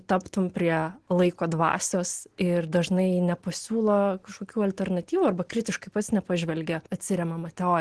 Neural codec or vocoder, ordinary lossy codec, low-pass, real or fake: none; Opus, 16 kbps; 10.8 kHz; real